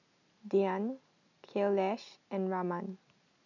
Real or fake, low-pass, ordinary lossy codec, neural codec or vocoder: real; 7.2 kHz; none; none